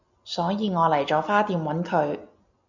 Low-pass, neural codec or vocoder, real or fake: 7.2 kHz; none; real